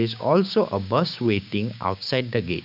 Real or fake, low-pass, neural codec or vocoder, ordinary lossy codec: fake; 5.4 kHz; codec, 16 kHz, 6 kbps, DAC; none